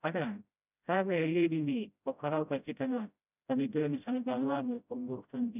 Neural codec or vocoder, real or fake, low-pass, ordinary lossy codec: codec, 16 kHz, 0.5 kbps, FreqCodec, smaller model; fake; 3.6 kHz; none